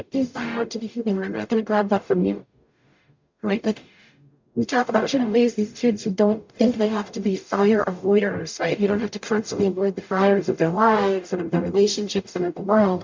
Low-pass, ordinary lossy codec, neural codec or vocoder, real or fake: 7.2 kHz; MP3, 64 kbps; codec, 44.1 kHz, 0.9 kbps, DAC; fake